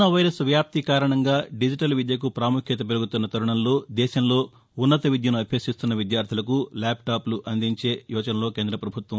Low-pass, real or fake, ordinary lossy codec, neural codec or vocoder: none; real; none; none